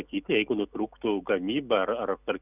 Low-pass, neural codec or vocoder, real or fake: 3.6 kHz; none; real